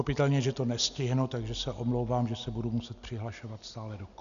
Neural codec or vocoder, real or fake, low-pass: none; real; 7.2 kHz